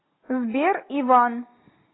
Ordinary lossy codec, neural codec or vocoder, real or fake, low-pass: AAC, 16 kbps; none; real; 7.2 kHz